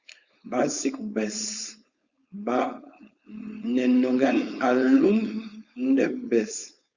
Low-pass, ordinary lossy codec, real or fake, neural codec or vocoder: 7.2 kHz; Opus, 64 kbps; fake; codec, 16 kHz, 4.8 kbps, FACodec